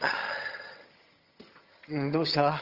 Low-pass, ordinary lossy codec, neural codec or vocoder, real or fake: 5.4 kHz; Opus, 24 kbps; vocoder, 22.05 kHz, 80 mel bands, HiFi-GAN; fake